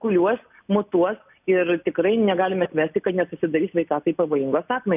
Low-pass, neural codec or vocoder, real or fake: 3.6 kHz; none; real